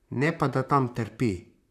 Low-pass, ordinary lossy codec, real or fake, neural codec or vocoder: 14.4 kHz; none; fake; vocoder, 44.1 kHz, 128 mel bands, Pupu-Vocoder